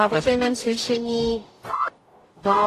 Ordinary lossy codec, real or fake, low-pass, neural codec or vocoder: AAC, 48 kbps; fake; 14.4 kHz; codec, 44.1 kHz, 0.9 kbps, DAC